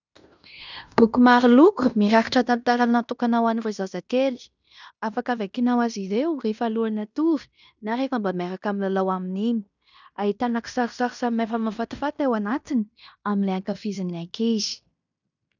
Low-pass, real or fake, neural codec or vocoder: 7.2 kHz; fake; codec, 16 kHz in and 24 kHz out, 0.9 kbps, LongCat-Audio-Codec, fine tuned four codebook decoder